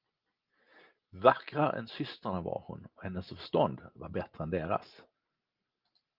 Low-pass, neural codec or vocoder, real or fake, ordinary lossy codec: 5.4 kHz; none; real; Opus, 24 kbps